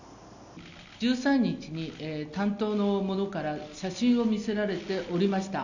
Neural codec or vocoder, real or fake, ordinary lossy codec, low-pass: none; real; none; 7.2 kHz